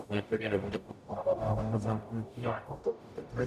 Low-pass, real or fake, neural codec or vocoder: 14.4 kHz; fake; codec, 44.1 kHz, 0.9 kbps, DAC